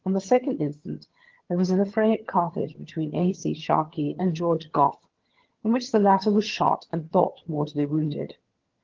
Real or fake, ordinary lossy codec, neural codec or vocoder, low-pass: fake; Opus, 16 kbps; vocoder, 22.05 kHz, 80 mel bands, HiFi-GAN; 7.2 kHz